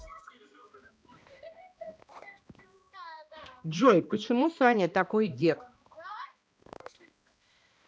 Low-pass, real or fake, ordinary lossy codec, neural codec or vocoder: none; fake; none; codec, 16 kHz, 1 kbps, X-Codec, HuBERT features, trained on balanced general audio